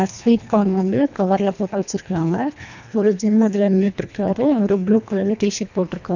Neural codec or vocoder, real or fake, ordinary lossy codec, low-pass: codec, 24 kHz, 1.5 kbps, HILCodec; fake; none; 7.2 kHz